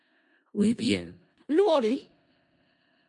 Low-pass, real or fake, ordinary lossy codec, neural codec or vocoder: 10.8 kHz; fake; MP3, 48 kbps; codec, 16 kHz in and 24 kHz out, 0.4 kbps, LongCat-Audio-Codec, four codebook decoder